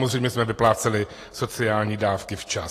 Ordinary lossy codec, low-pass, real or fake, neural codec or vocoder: AAC, 48 kbps; 14.4 kHz; real; none